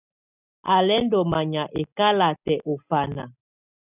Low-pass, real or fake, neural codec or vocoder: 3.6 kHz; real; none